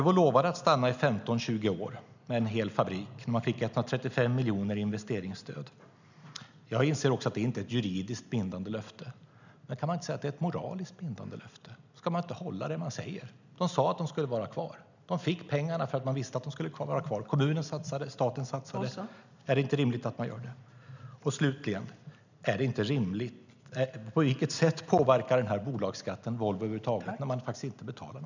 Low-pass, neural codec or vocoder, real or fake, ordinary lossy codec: 7.2 kHz; none; real; none